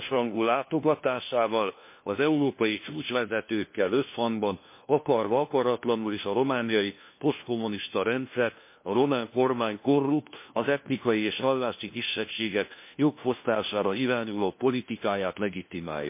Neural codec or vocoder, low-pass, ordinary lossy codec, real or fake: codec, 16 kHz, 1 kbps, FunCodec, trained on LibriTTS, 50 frames a second; 3.6 kHz; MP3, 24 kbps; fake